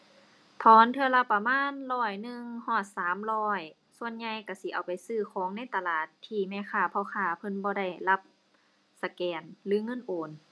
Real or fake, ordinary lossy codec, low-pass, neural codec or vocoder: real; none; none; none